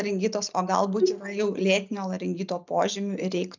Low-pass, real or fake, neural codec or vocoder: 7.2 kHz; real; none